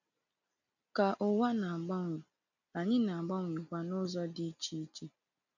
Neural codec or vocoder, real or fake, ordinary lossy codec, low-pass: none; real; none; 7.2 kHz